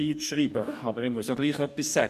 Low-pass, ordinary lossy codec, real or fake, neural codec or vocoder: 14.4 kHz; none; fake; codec, 44.1 kHz, 2.6 kbps, DAC